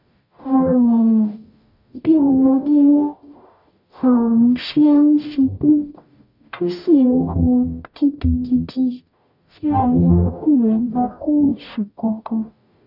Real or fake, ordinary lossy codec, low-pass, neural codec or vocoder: fake; none; 5.4 kHz; codec, 44.1 kHz, 0.9 kbps, DAC